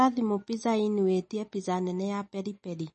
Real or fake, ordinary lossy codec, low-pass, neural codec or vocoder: real; MP3, 32 kbps; 10.8 kHz; none